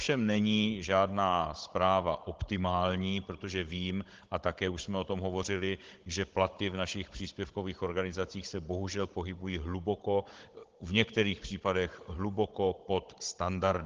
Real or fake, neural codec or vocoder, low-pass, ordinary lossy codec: fake; codec, 16 kHz, 16 kbps, FunCodec, trained on Chinese and English, 50 frames a second; 7.2 kHz; Opus, 16 kbps